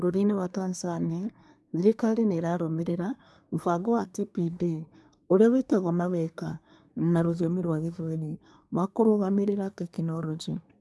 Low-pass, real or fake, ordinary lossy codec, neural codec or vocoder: none; fake; none; codec, 24 kHz, 1 kbps, SNAC